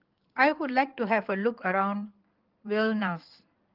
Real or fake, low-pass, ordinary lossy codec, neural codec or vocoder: fake; 5.4 kHz; Opus, 32 kbps; codec, 16 kHz in and 24 kHz out, 2.2 kbps, FireRedTTS-2 codec